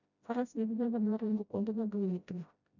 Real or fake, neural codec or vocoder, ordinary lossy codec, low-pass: fake; codec, 16 kHz, 0.5 kbps, FreqCodec, smaller model; none; 7.2 kHz